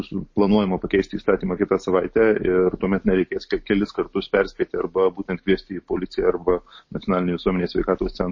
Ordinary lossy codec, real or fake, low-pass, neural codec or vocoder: MP3, 32 kbps; real; 7.2 kHz; none